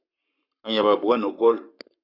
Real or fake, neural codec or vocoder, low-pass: fake; codec, 44.1 kHz, 7.8 kbps, Pupu-Codec; 5.4 kHz